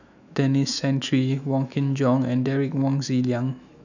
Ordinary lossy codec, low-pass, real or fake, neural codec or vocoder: none; 7.2 kHz; fake; autoencoder, 48 kHz, 128 numbers a frame, DAC-VAE, trained on Japanese speech